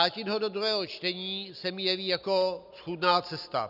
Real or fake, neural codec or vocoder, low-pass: real; none; 5.4 kHz